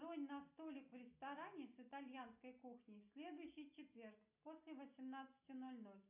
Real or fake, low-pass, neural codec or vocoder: real; 3.6 kHz; none